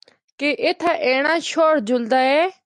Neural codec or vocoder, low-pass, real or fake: none; 10.8 kHz; real